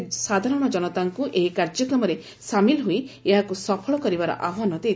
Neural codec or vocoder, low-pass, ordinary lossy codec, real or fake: none; none; none; real